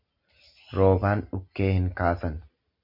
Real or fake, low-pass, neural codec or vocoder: real; 5.4 kHz; none